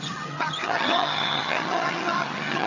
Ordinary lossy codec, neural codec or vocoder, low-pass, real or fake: none; vocoder, 22.05 kHz, 80 mel bands, HiFi-GAN; 7.2 kHz; fake